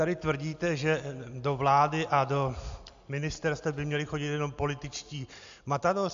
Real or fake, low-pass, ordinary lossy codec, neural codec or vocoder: real; 7.2 kHz; AAC, 64 kbps; none